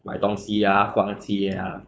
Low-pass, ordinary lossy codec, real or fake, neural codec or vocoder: none; none; fake; codec, 16 kHz, 4.8 kbps, FACodec